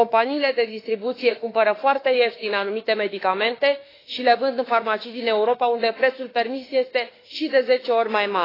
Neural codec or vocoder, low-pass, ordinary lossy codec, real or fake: autoencoder, 48 kHz, 32 numbers a frame, DAC-VAE, trained on Japanese speech; 5.4 kHz; AAC, 24 kbps; fake